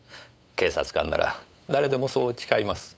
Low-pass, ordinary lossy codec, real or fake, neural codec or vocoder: none; none; fake; codec, 16 kHz, 8 kbps, FunCodec, trained on LibriTTS, 25 frames a second